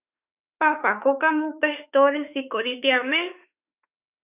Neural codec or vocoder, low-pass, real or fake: autoencoder, 48 kHz, 32 numbers a frame, DAC-VAE, trained on Japanese speech; 3.6 kHz; fake